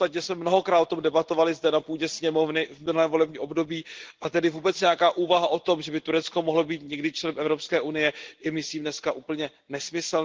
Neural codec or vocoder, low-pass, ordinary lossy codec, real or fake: none; 7.2 kHz; Opus, 16 kbps; real